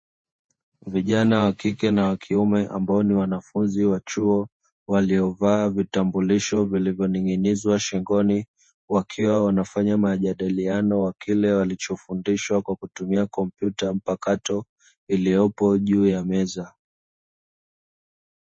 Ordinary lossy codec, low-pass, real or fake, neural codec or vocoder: MP3, 32 kbps; 9.9 kHz; fake; vocoder, 48 kHz, 128 mel bands, Vocos